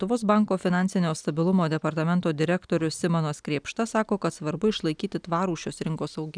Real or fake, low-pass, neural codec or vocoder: real; 9.9 kHz; none